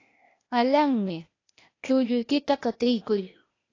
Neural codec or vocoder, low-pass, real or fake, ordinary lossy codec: codec, 16 kHz, 0.8 kbps, ZipCodec; 7.2 kHz; fake; AAC, 32 kbps